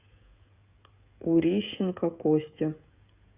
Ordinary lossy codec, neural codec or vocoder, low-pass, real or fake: Opus, 64 kbps; codec, 16 kHz, 16 kbps, FreqCodec, smaller model; 3.6 kHz; fake